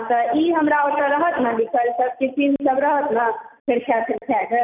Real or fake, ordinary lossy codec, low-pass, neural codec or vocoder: real; none; 3.6 kHz; none